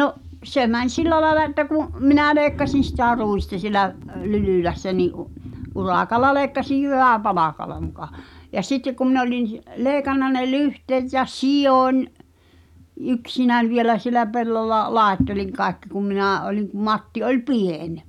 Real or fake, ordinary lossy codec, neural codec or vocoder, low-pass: real; none; none; 19.8 kHz